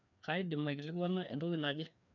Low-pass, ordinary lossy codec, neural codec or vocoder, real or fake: 7.2 kHz; none; codec, 16 kHz, 2 kbps, FreqCodec, larger model; fake